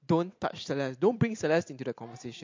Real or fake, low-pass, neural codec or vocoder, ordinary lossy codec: real; 7.2 kHz; none; MP3, 48 kbps